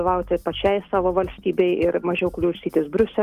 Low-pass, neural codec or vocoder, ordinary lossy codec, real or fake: 14.4 kHz; none; Opus, 32 kbps; real